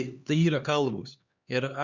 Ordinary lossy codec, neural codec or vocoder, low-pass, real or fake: Opus, 64 kbps; codec, 16 kHz, 2 kbps, X-Codec, HuBERT features, trained on LibriSpeech; 7.2 kHz; fake